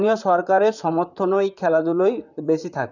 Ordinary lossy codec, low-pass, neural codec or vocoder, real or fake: none; 7.2 kHz; vocoder, 44.1 kHz, 128 mel bands, Pupu-Vocoder; fake